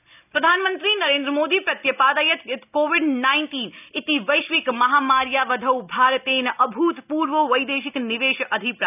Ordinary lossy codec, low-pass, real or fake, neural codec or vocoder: none; 3.6 kHz; real; none